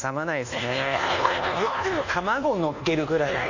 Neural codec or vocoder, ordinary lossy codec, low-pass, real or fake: codec, 24 kHz, 1.2 kbps, DualCodec; none; 7.2 kHz; fake